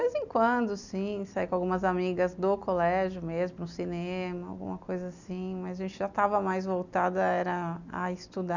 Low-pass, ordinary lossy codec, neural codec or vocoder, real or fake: 7.2 kHz; none; none; real